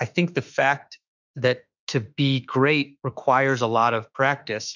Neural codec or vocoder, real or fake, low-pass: autoencoder, 48 kHz, 32 numbers a frame, DAC-VAE, trained on Japanese speech; fake; 7.2 kHz